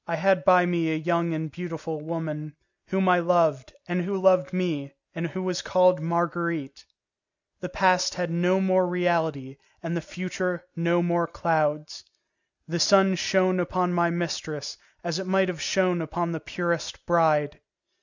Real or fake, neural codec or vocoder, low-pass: real; none; 7.2 kHz